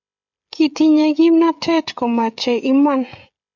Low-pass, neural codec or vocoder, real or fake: 7.2 kHz; codec, 16 kHz, 16 kbps, FreqCodec, smaller model; fake